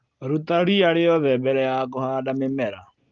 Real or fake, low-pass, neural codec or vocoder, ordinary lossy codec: real; 7.2 kHz; none; Opus, 32 kbps